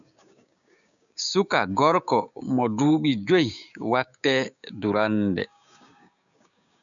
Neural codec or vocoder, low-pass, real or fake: codec, 16 kHz, 6 kbps, DAC; 7.2 kHz; fake